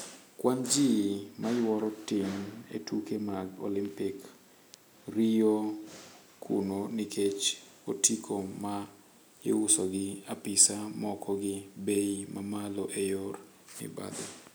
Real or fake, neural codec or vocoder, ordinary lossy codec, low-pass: real; none; none; none